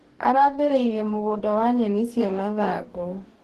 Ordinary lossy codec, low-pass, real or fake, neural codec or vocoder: Opus, 16 kbps; 14.4 kHz; fake; codec, 44.1 kHz, 2.6 kbps, DAC